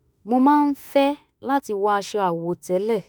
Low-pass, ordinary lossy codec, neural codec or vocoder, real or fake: none; none; autoencoder, 48 kHz, 32 numbers a frame, DAC-VAE, trained on Japanese speech; fake